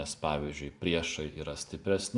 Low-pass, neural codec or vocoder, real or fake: 10.8 kHz; vocoder, 44.1 kHz, 128 mel bands every 256 samples, BigVGAN v2; fake